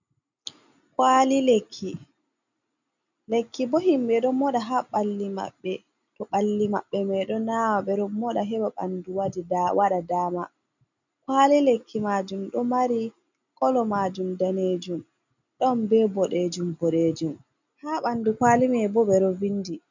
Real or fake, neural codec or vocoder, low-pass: real; none; 7.2 kHz